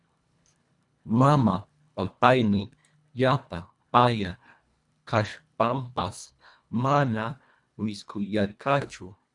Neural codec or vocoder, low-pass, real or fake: codec, 24 kHz, 1.5 kbps, HILCodec; 10.8 kHz; fake